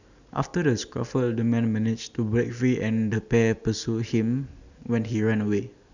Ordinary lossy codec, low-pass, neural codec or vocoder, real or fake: none; 7.2 kHz; none; real